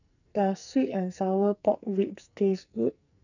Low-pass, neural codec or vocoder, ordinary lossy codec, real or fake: 7.2 kHz; codec, 44.1 kHz, 2.6 kbps, SNAC; none; fake